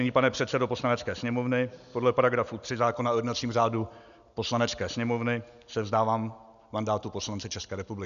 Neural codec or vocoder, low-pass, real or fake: none; 7.2 kHz; real